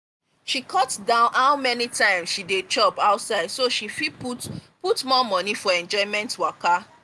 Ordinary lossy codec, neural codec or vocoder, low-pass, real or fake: none; none; none; real